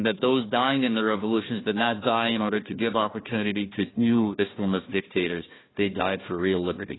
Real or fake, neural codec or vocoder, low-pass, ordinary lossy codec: fake; codec, 16 kHz, 1 kbps, FunCodec, trained on Chinese and English, 50 frames a second; 7.2 kHz; AAC, 16 kbps